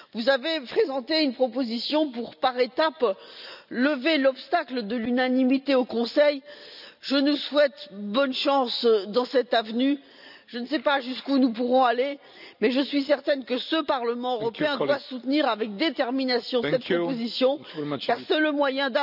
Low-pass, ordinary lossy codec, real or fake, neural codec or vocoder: 5.4 kHz; none; real; none